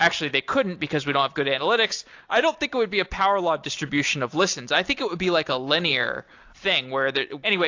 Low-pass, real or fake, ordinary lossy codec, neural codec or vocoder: 7.2 kHz; real; AAC, 48 kbps; none